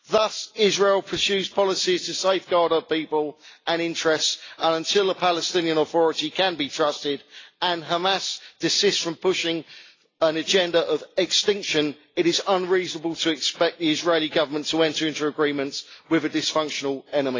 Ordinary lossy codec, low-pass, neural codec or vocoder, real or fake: AAC, 32 kbps; 7.2 kHz; none; real